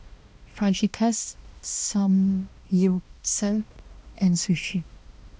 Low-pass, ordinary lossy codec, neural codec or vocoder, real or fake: none; none; codec, 16 kHz, 1 kbps, X-Codec, HuBERT features, trained on balanced general audio; fake